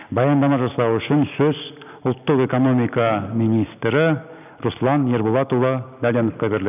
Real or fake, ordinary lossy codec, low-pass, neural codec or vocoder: real; none; 3.6 kHz; none